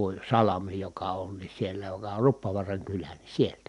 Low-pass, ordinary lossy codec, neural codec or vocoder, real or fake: 10.8 kHz; none; none; real